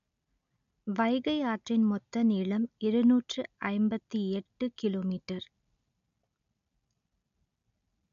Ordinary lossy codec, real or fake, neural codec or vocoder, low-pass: none; real; none; 7.2 kHz